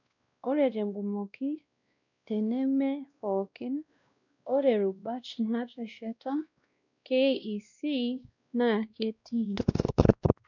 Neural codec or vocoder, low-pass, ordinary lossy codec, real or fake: codec, 16 kHz, 1 kbps, X-Codec, WavLM features, trained on Multilingual LibriSpeech; 7.2 kHz; none; fake